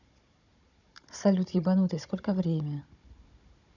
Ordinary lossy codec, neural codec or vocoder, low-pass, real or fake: none; codec, 16 kHz, 16 kbps, FunCodec, trained on Chinese and English, 50 frames a second; 7.2 kHz; fake